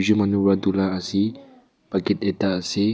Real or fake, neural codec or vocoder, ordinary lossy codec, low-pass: real; none; none; none